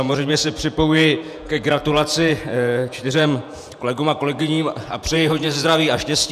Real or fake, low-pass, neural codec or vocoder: fake; 14.4 kHz; vocoder, 48 kHz, 128 mel bands, Vocos